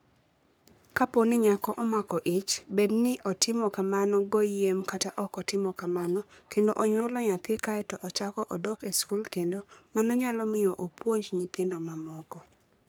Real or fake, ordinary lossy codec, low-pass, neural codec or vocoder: fake; none; none; codec, 44.1 kHz, 3.4 kbps, Pupu-Codec